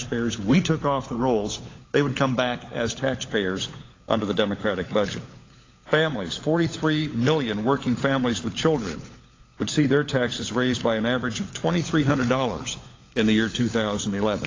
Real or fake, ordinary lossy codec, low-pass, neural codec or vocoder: fake; AAC, 32 kbps; 7.2 kHz; codec, 16 kHz, 16 kbps, FunCodec, trained on LibriTTS, 50 frames a second